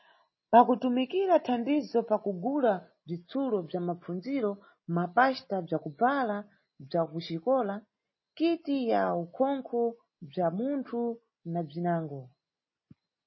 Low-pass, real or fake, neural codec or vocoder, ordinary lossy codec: 7.2 kHz; real; none; MP3, 24 kbps